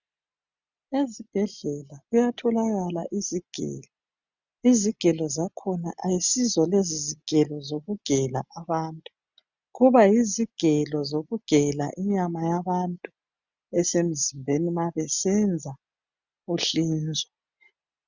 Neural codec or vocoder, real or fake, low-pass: none; real; 7.2 kHz